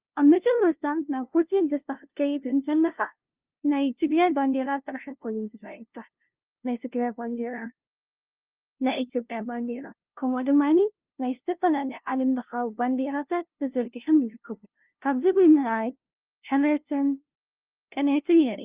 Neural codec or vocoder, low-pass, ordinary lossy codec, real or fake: codec, 16 kHz, 0.5 kbps, FunCodec, trained on LibriTTS, 25 frames a second; 3.6 kHz; Opus, 24 kbps; fake